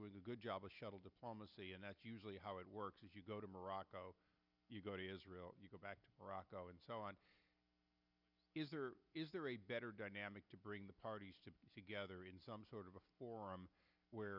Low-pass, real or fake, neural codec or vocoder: 5.4 kHz; real; none